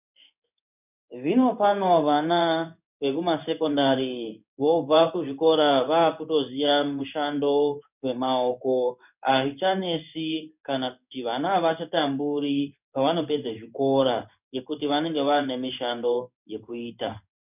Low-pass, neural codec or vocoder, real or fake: 3.6 kHz; codec, 16 kHz in and 24 kHz out, 1 kbps, XY-Tokenizer; fake